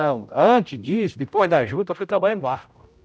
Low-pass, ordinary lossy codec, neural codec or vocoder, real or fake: none; none; codec, 16 kHz, 0.5 kbps, X-Codec, HuBERT features, trained on general audio; fake